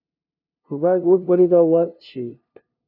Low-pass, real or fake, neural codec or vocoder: 5.4 kHz; fake; codec, 16 kHz, 0.5 kbps, FunCodec, trained on LibriTTS, 25 frames a second